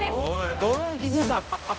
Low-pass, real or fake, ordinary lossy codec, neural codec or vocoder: none; fake; none; codec, 16 kHz, 0.5 kbps, X-Codec, HuBERT features, trained on balanced general audio